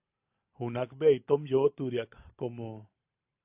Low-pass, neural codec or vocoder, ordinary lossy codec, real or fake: 3.6 kHz; vocoder, 44.1 kHz, 128 mel bands every 512 samples, BigVGAN v2; AAC, 32 kbps; fake